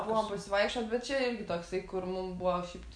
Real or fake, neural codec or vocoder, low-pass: real; none; 9.9 kHz